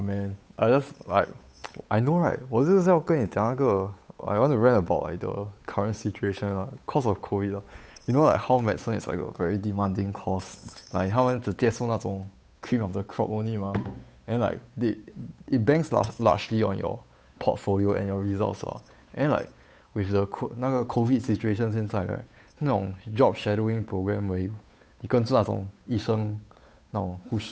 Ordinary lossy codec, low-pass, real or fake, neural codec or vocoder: none; none; fake; codec, 16 kHz, 8 kbps, FunCodec, trained on Chinese and English, 25 frames a second